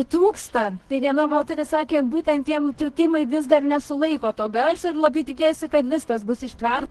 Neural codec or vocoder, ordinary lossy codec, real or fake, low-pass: codec, 24 kHz, 0.9 kbps, WavTokenizer, medium music audio release; Opus, 16 kbps; fake; 10.8 kHz